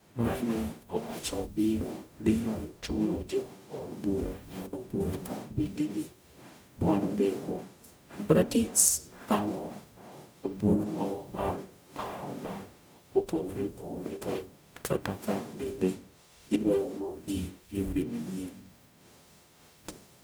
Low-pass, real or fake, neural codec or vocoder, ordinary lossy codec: none; fake; codec, 44.1 kHz, 0.9 kbps, DAC; none